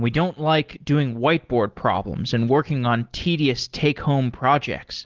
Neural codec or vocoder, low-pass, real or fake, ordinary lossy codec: none; 7.2 kHz; real; Opus, 16 kbps